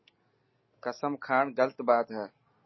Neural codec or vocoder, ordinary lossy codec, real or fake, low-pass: none; MP3, 24 kbps; real; 7.2 kHz